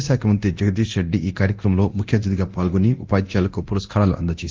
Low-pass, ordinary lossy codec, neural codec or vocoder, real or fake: 7.2 kHz; Opus, 32 kbps; codec, 24 kHz, 0.9 kbps, DualCodec; fake